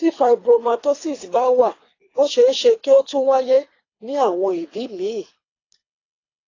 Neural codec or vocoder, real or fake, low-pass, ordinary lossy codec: codec, 24 kHz, 3 kbps, HILCodec; fake; 7.2 kHz; AAC, 32 kbps